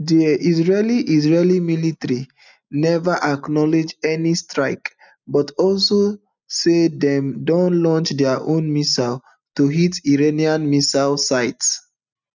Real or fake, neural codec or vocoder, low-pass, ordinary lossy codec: real; none; 7.2 kHz; none